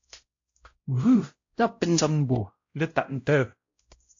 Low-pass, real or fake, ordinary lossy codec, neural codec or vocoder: 7.2 kHz; fake; AAC, 48 kbps; codec, 16 kHz, 0.5 kbps, X-Codec, WavLM features, trained on Multilingual LibriSpeech